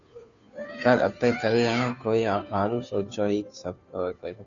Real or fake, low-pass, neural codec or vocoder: fake; 7.2 kHz; codec, 16 kHz, 2 kbps, FunCodec, trained on Chinese and English, 25 frames a second